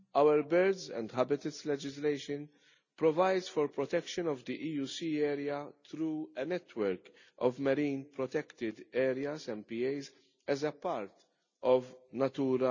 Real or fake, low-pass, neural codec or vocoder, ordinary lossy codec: real; 7.2 kHz; none; none